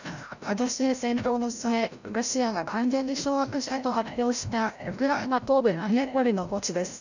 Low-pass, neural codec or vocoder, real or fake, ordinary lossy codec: 7.2 kHz; codec, 16 kHz, 0.5 kbps, FreqCodec, larger model; fake; none